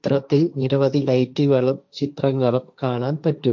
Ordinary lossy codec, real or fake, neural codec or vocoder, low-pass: none; fake; codec, 16 kHz, 1.1 kbps, Voila-Tokenizer; none